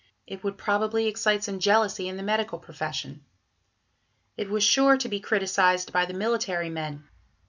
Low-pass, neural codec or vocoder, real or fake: 7.2 kHz; none; real